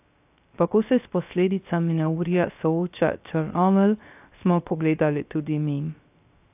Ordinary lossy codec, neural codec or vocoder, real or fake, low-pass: AAC, 32 kbps; codec, 16 kHz, 0.3 kbps, FocalCodec; fake; 3.6 kHz